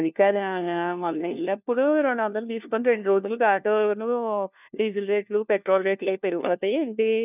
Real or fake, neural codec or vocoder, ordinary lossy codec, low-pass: fake; codec, 16 kHz, 1 kbps, FunCodec, trained on LibriTTS, 50 frames a second; none; 3.6 kHz